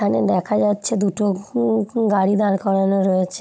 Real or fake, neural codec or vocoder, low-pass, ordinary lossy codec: fake; codec, 16 kHz, 16 kbps, FunCodec, trained on Chinese and English, 50 frames a second; none; none